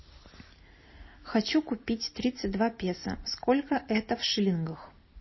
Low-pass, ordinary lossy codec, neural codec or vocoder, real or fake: 7.2 kHz; MP3, 24 kbps; none; real